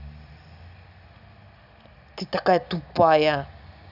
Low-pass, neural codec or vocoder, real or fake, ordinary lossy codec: 5.4 kHz; none; real; none